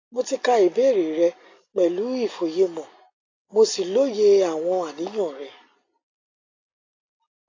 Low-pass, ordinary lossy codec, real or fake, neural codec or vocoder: 7.2 kHz; AAC, 32 kbps; real; none